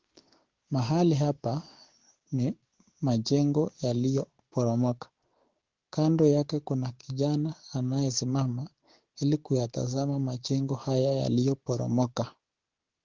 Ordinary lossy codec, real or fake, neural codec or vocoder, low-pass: Opus, 16 kbps; fake; codec, 16 kHz, 6 kbps, DAC; 7.2 kHz